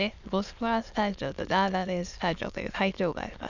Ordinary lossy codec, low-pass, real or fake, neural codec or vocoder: none; 7.2 kHz; fake; autoencoder, 22.05 kHz, a latent of 192 numbers a frame, VITS, trained on many speakers